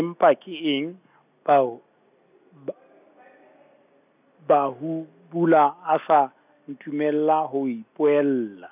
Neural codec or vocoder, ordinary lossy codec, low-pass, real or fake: none; none; 3.6 kHz; real